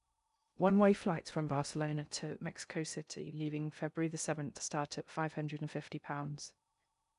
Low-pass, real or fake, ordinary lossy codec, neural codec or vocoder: 10.8 kHz; fake; none; codec, 16 kHz in and 24 kHz out, 0.6 kbps, FocalCodec, streaming, 2048 codes